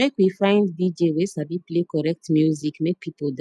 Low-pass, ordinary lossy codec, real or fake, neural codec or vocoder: none; none; real; none